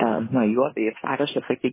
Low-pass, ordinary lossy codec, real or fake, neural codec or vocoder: 3.6 kHz; MP3, 16 kbps; fake; codec, 16 kHz in and 24 kHz out, 0.9 kbps, LongCat-Audio-Codec, fine tuned four codebook decoder